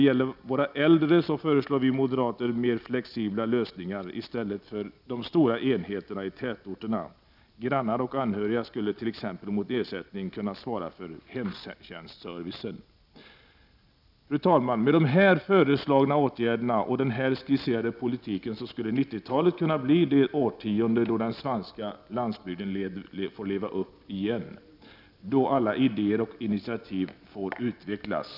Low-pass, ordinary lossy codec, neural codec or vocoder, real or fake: 5.4 kHz; none; none; real